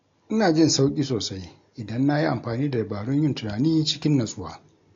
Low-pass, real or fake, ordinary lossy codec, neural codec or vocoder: 7.2 kHz; real; AAC, 48 kbps; none